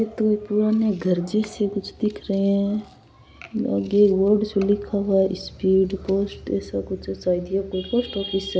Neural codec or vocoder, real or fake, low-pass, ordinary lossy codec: none; real; none; none